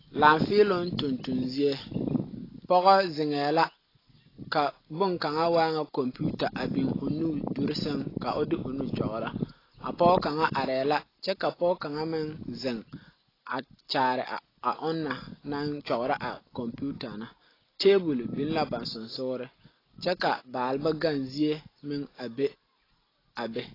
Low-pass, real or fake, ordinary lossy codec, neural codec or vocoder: 5.4 kHz; real; AAC, 24 kbps; none